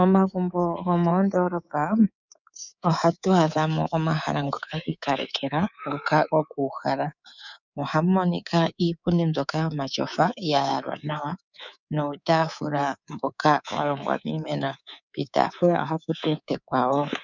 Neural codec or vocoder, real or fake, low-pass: codec, 24 kHz, 3.1 kbps, DualCodec; fake; 7.2 kHz